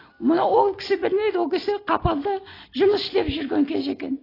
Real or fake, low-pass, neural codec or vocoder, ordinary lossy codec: real; 5.4 kHz; none; AAC, 24 kbps